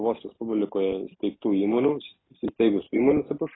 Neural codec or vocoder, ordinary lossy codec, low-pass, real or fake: none; AAC, 16 kbps; 7.2 kHz; real